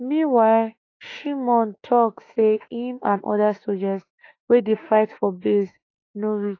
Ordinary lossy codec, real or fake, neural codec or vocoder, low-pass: AAC, 32 kbps; fake; autoencoder, 48 kHz, 32 numbers a frame, DAC-VAE, trained on Japanese speech; 7.2 kHz